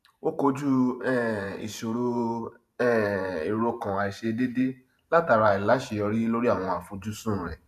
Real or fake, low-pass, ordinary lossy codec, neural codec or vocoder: fake; 14.4 kHz; MP3, 96 kbps; vocoder, 44.1 kHz, 128 mel bands every 512 samples, BigVGAN v2